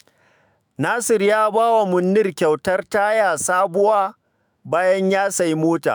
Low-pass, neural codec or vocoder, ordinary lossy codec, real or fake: none; autoencoder, 48 kHz, 128 numbers a frame, DAC-VAE, trained on Japanese speech; none; fake